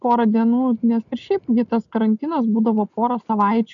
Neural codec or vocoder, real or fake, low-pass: none; real; 7.2 kHz